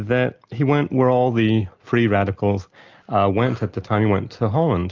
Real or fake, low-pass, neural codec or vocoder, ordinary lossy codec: real; 7.2 kHz; none; Opus, 24 kbps